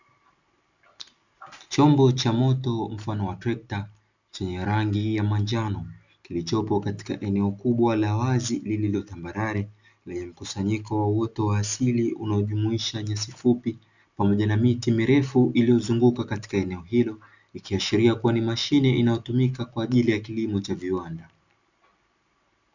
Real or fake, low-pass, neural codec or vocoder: real; 7.2 kHz; none